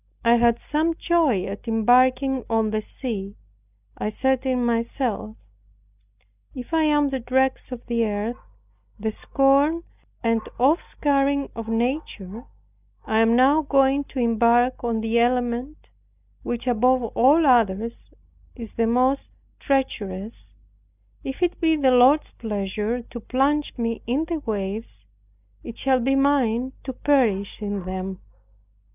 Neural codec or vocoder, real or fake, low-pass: none; real; 3.6 kHz